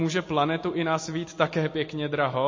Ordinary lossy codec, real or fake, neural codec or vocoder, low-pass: MP3, 32 kbps; real; none; 7.2 kHz